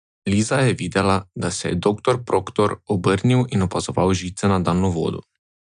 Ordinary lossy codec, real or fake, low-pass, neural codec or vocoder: none; real; 9.9 kHz; none